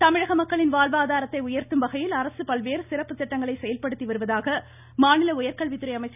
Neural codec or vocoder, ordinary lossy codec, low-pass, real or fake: none; none; 3.6 kHz; real